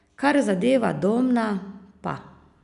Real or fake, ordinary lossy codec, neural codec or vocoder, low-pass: real; none; none; 10.8 kHz